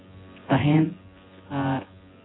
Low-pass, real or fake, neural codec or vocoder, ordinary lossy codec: 7.2 kHz; fake; vocoder, 24 kHz, 100 mel bands, Vocos; AAC, 16 kbps